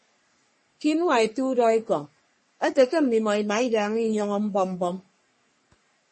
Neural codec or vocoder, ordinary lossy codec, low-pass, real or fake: codec, 44.1 kHz, 3.4 kbps, Pupu-Codec; MP3, 32 kbps; 10.8 kHz; fake